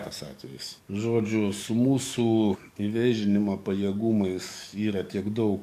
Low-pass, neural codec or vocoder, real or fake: 14.4 kHz; codec, 44.1 kHz, 7.8 kbps, DAC; fake